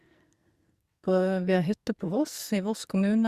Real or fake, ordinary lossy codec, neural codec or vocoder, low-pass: fake; Opus, 64 kbps; codec, 44.1 kHz, 2.6 kbps, SNAC; 14.4 kHz